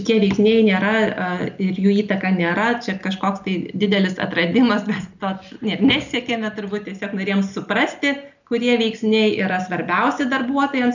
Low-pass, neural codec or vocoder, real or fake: 7.2 kHz; none; real